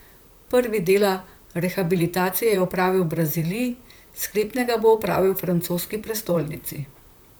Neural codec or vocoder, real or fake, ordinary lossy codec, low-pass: vocoder, 44.1 kHz, 128 mel bands, Pupu-Vocoder; fake; none; none